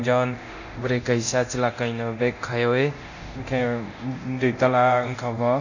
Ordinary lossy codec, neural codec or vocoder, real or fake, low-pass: none; codec, 24 kHz, 0.9 kbps, DualCodec; fake; 7.2 kHz